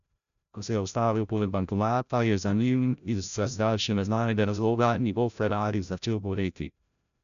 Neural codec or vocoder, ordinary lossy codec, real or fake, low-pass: codec, 16 kHz, 0.5 kbps, FreqCodec, larger model; none; fake; 7.2 kHz